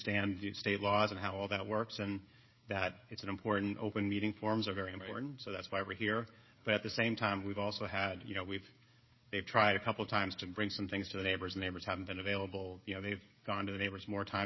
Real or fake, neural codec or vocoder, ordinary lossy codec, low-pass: fake; codec, 16 kHz, 16 kbps, FreqCodec, smaller model; MP3, 24 kbps; 7.2 kHz